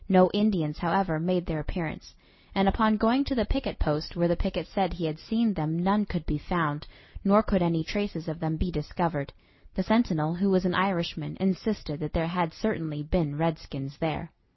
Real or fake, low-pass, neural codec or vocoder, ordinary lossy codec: real; 7.2 kHz; none; MP3, 24 kbps